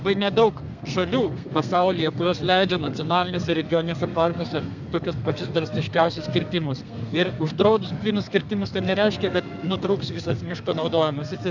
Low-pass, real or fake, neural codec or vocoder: 7.2 kHz; fake; codec, 32 kHz, 1.9 kbps, SNAC